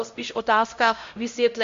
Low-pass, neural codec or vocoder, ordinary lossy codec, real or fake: 7.2 kHz; codec, 16 kHz, 0.5 kbps, X-Codec, HuBERT features, trained on LibriSpeech; MP3, 48 kbps; fake